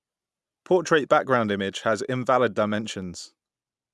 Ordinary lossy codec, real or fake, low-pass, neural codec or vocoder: none; real; none; none